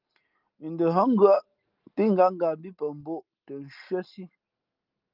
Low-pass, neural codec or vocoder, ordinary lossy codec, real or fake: 5.4 kHz; none; Opus, 32 kbps; real